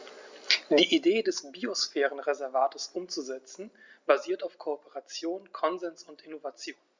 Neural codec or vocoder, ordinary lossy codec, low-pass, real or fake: none; Opus, 64 kbps; 7.2 kHz; real